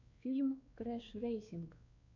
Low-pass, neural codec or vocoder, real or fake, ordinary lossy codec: 7.2 kHz; codec, 16 kHz, 2 kbps, X-Codec, WavLM features, trained on Multilingual LibriSpeech; fake; MP3, 48 kbps